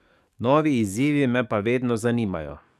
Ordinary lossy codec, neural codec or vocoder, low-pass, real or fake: none; codec, 44.1 kHz, 7.8 kbps, DAC; 14.4 kHz; fake